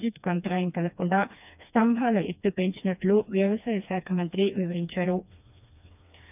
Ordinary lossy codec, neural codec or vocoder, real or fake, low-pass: none; codec, 16 kHz, 2 kbps, FreqCodec, smaller model; fake; 3.6 kHz